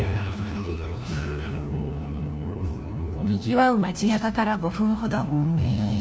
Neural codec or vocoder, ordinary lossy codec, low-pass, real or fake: codec, 16 kHz, 1 kbps, FunCodec, trained on LibriTTS, 50 frames a second; none; none; fake